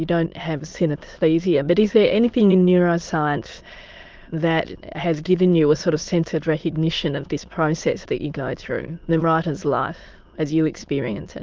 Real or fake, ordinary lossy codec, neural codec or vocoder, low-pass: fake; Opus, 32 kbps; autoencoder, 22.05 kHz, a latent of 192 numbers a frame, VITS, trained on many speakers; 7.2 kHz